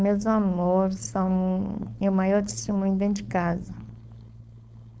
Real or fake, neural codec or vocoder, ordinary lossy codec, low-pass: fake; codec, 16 kHz, 4.8 kbps, FACodec; none; none